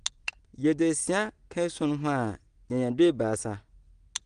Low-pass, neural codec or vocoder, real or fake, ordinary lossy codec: 9.9 kHz; none; real; Opus, 24 kbps